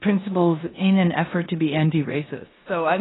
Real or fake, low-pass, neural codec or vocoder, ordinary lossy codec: fake; 7.2 kHz; codec, 16 kHz in and 24 kHz out, 0.9 kbps, LongCat-Audio-Codec, four codebook decoder; AAC, 16 kbps